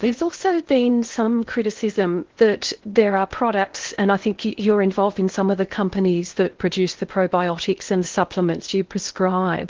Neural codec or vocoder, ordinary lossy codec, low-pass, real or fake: codec, 16 kHz in and 24 kHz out, 0.8 kbps, FocalCodec, streaming, 65536 codes; Opus, 16 kbps; 7.2 kHz; fake